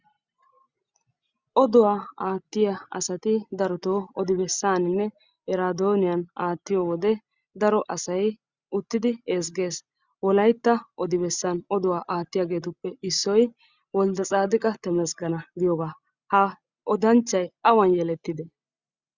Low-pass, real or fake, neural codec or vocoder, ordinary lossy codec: 7.2 kHz; real; none; Opus, 64 kbps